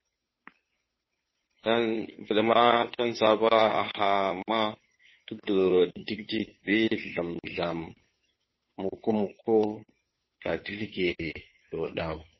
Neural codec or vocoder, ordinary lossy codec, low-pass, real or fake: codec, 16 kHz, 2 kbps, FunCodec, trained on Chinese and English, 25 frames a second; MP3, 24 kbps; 7.2 kHz; fake